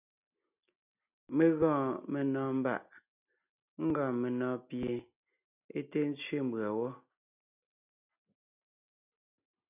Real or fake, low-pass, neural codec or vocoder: real; 3.6 kHz; none